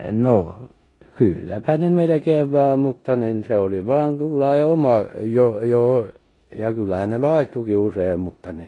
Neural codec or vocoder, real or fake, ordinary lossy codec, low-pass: codec, 16 kHz in and 24 kHz out, 0.9 kbps, LongCat-Audio-Codec, four codebook decoder; fake; AAC, 32 kbps; 10.8 kHz